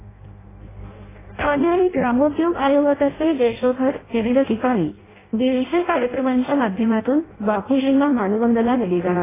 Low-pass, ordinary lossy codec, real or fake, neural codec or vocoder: 3.6 kHz; AAC, 16 kbps; fake; codec, 16 kHz in and 24 kHz out, 0.6 kbps, FireRedTTS-2 codec